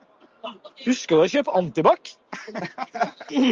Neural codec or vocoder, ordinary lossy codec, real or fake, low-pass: none; Opus, 32 kbps; real; 7.2 kHz